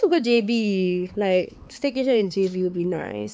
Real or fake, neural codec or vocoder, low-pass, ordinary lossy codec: fake; codec, 16 kHz, 4 kbps, X-Codec, HuBERT features, trained on LibriSpeech; none; none